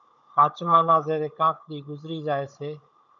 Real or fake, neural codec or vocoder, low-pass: fake; codec, 16 kHz, 16 kbps, FunCodec, trained on Chinese and English, 50 frames a second; 7.2 kHz